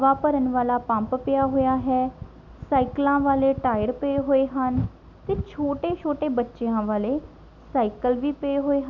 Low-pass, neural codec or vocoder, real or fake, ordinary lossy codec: 7.2 kHz; none; real; none